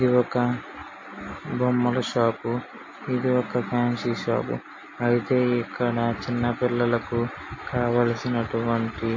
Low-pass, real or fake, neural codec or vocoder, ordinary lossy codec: 7.2 kHz; real; none; MP3, 32 kbps